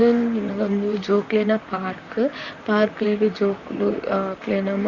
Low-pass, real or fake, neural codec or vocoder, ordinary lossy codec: 7.2 kHz; fake; vocoder, 44.1 kHz, 128 mel bands, Pupu-Vocoder; Opus, 64 kbps